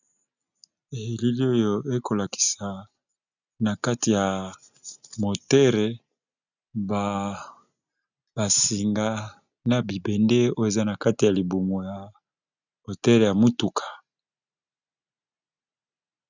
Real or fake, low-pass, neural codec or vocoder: real; 7.2 kHz; none